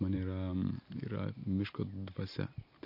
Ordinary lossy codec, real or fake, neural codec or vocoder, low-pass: MP3, 32 kbps; real; none; 5.4 kHz